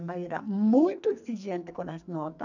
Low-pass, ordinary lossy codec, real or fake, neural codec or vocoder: 7.2 kHz; none; fake; codec, 44.1 kHz, 2.6 kbps, SNAC